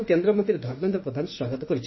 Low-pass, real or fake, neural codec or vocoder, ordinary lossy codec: 7.2 kHz; fake; autoencoder, 48 kHz, 32 numbers a frame, DAC-VAE, trained on Japanese speech; MP3, 24 kbps